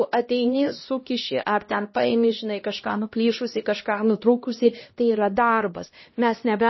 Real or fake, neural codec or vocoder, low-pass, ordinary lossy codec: fake; codec, 16 kHz, 1 kbps, X-Codec, HuBERT features, trained on LibriSpeech; 7.2 kHz; MP3, 24 kbps